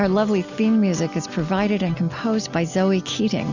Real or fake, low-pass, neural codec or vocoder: real; 7.2 kHz; none